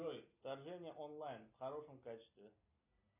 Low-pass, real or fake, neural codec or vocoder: 3.6 kHz; real; none